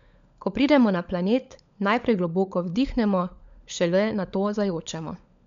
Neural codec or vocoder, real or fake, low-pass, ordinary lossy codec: codec, 16 kHz, 16 kbps, FunCodec, trained on LibriTTS, 50 frames a second; fake; 7.2 kHz; MP3, 64 kbps